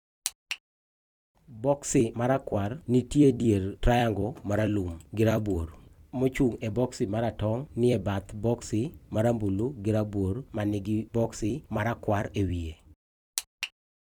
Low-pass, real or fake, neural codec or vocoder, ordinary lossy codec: 19.8 kHz; fake; vocoder, 44.1 kHz, 128 mel bands every 256 samples, BigVGAN v2; none